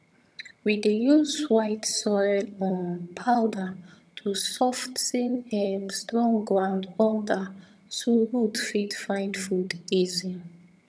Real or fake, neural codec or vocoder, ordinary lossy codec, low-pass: fake; vocoder, 22.05 kHz, 80 mel bands, HiFi-GAN; none; none